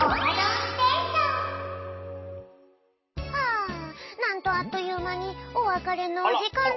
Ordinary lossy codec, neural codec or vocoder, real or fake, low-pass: MP3, 24 kbps; none; real; 7.2 kHz